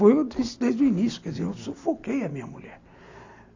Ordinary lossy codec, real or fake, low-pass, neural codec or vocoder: AAC, 48 kbps; real; 7.2 kHz; none